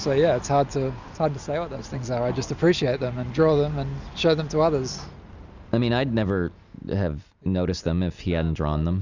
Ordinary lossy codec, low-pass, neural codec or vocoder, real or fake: Opus, 64 kbps; 7.2 kHz; none; real